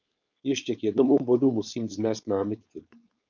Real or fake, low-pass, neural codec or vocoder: fake; 7.2 kHz; codec, 16 kHz, 4.8 kbps, FACodec